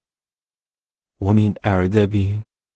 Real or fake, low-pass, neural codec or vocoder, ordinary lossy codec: fake; 10.8 kHz; codec, 16 kHz in and 24 kHz out, 0.4 kbps, LongCat-Audio-Codec, two codebook decoder; Opus, 16 kbps